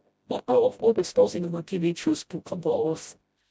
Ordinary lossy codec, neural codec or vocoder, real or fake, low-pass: none; codec, 16 kHz, 0.5 kbps, FreqCodec, smaller model; fake; none